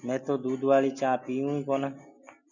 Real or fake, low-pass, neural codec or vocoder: real; 7.2 kHz; none